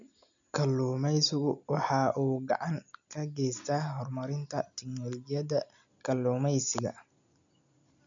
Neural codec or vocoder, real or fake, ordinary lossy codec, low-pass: none; real; none; 7.2 kHz